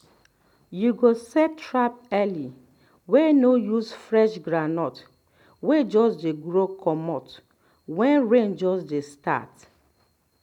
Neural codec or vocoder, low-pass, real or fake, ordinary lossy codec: none; 19.8 kHz; real; none